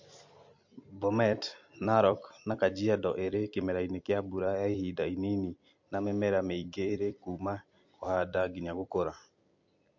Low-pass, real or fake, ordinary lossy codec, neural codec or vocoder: 7.2 kHz; fake; MP3, 48 kbps; vocoder, 44.1 kHz, 128 mel bands every 256 samples, BigVGAN v2